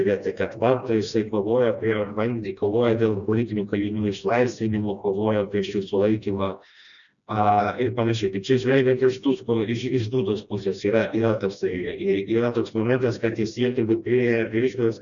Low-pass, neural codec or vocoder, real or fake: 7.2 kHz; codec, 16 kHz, 1 kbps, FreqCodec, smaller model; fake